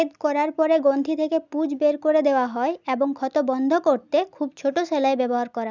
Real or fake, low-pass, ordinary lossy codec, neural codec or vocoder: real; 7.2 kHz; none; none